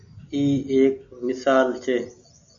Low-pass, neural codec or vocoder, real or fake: 7.2 kHz; none; real